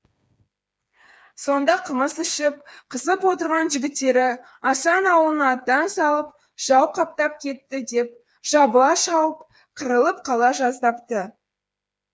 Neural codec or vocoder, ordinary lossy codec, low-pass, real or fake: codec, 16 kHz, 4 kbps, FreqCodec, smaller model; none; none; fake